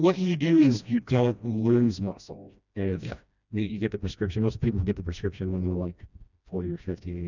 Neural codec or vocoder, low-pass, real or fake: codec, 16 kHz, 1 kbps, FreqCodec, smaller model; 7.2 kHz; fake